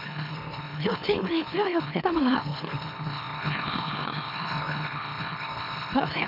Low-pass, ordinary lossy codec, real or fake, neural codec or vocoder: 5.4 kHz; none; fake; autoencoder, 44.1 kHz, a latent of 192 numbers a frame, MeloTTS